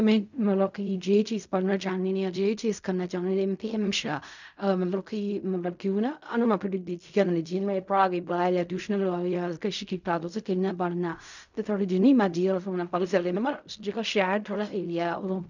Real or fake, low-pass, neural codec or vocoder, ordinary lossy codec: fake; 7.2 kHz; codec, 16 kHz in and 24 kHz out, 0.4 kbps, LongCat-Audio-Codec, fine tuned four codebook decoder; none